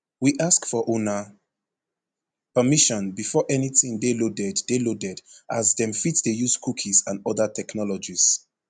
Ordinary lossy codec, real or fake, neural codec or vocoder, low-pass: none; real; none; 9.9 kHz